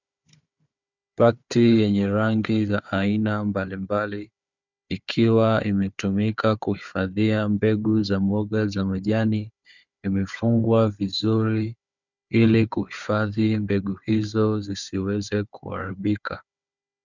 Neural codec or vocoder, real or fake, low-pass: codec, 16 kHz, 4 kbps, FunCodec, trained on Chinese and English, 50 frames a second; fake; 7.2 kHz